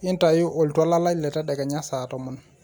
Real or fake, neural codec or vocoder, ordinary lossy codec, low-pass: real; none; none; none